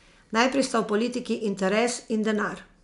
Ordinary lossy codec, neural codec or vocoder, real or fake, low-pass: none; none; real; 10.8 kHz